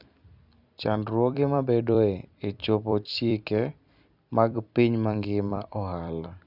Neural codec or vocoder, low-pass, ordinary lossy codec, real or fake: vocoder, 44.1 kHz, 128 mel bands every 256 samples, BigVGAN v2; 5.4 kHz; none; fake